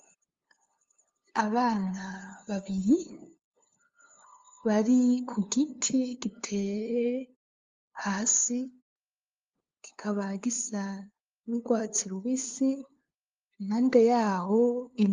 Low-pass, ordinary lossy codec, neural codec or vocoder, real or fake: 7.2 kHz; Opus, 32 kbps; codec, 16 kHz, 2 kbps, FunCodec, trained on LibriTTS, 25 frames a second; fake